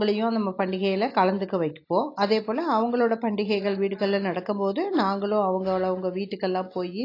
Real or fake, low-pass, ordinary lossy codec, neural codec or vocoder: real; 5.4 kHz; AAC, 32 kbps; none